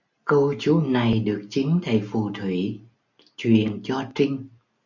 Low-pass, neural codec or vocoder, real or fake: 7.2 kHz; none; real